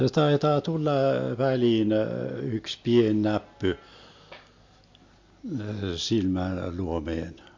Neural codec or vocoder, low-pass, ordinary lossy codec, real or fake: none; 7.2 kHz; MP3, 48 kbps; real